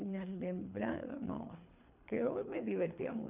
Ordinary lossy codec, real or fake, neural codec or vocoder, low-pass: none; fake; codec, 24 kHz, 3 kbps, HILCodec; 3.6 kHz